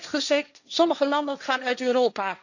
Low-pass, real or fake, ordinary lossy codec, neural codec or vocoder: 7.2 kHz; fake; none; codec, 16 kHz, 1.1 kbps, Voila-Tokenizer